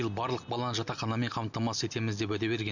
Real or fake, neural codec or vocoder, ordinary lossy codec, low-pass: real; none; none; 7.2 kHz